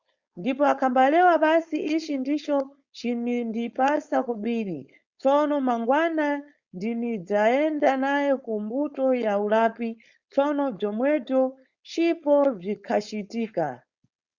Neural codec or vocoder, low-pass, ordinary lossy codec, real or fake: codec, 16 kHz, 4.8 kbps, FACodec; 7.2 kHz; Opus, 64 kbps; fake